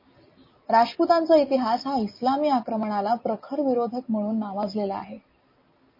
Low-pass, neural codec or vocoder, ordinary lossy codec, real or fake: 5.4 kHz; none; MP3, 24 kbps; real